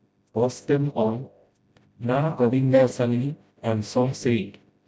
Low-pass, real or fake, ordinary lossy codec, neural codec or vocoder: none; fake; none; codec, 16 kHz, 0.5 kbps, FreqCodec, smaller model